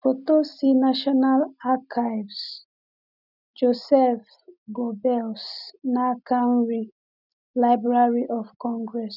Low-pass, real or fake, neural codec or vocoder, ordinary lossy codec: 5.4 kHz; real; none; none